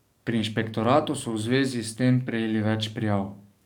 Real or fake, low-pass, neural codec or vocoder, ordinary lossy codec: fake; 19.8 kHz; codec, 44.1 kHz, 7.8 kbps, DAC; none